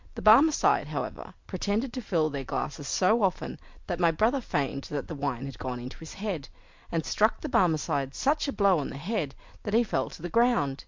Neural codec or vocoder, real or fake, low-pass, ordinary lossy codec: none; real; 7.2 kHz; MP3, 64 kbps